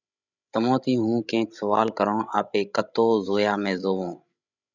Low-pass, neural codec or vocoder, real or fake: 7.2 kHz; codec, 16 kHz, 16 kbps, FreqCodec, larger model; fake